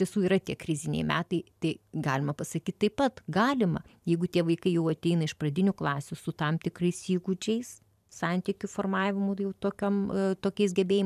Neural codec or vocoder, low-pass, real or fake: vocoder, 44.1 kHz, 128 mel bands every 512 samples, BigVGAN v2; 14.4 kHz; fake